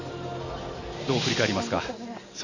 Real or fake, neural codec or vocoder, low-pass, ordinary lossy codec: real; none; 7.2 kHz; none